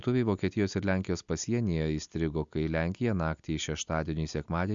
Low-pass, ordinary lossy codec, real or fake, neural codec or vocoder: 7.2 kHz; MP3, 64 kbps; real; none